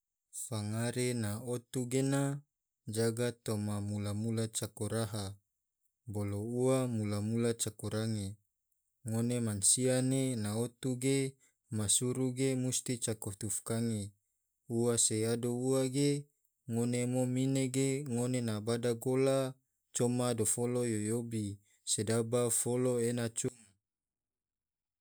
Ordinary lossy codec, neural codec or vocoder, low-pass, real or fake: none; none; none; real